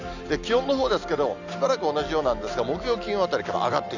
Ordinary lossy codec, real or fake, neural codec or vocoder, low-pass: none; real; none; 7.2 kHz